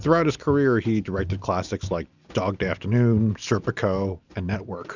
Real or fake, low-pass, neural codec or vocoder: real; 7.2 kHz; none